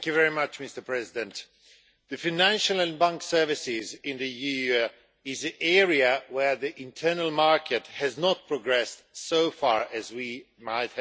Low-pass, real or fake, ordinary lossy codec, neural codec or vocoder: none; real; none; none